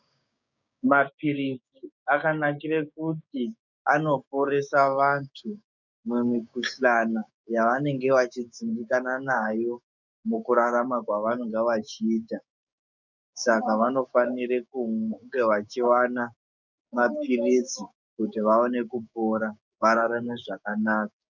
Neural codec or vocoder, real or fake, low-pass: codec, 16 kHz, 6 kbps, DAC; fake; 7.2 kHz